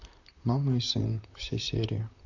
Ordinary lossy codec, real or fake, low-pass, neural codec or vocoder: none; fake; 7.2 kHz; vocoder, 44.1 kHz, 128 mel bands, Pupu-Vocoder